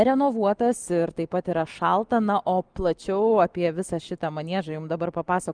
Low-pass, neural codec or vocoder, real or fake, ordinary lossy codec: 9.9 kHz; none; real; Opus, 24 kbps